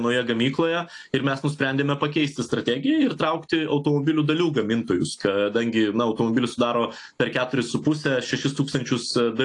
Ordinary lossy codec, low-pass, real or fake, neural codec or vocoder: AAC, 48 kbps; 10.8 kHz; real; none